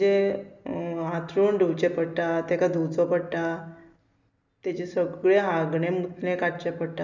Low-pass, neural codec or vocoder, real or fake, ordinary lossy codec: 7.2 kHz; none; real; none